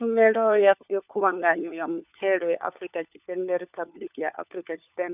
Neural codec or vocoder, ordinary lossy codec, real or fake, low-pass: codec, 16 kHz, 4 kbps, FunCodec, trained on LibriTTS, 50 frames a second; none; fake; 3.6 kHz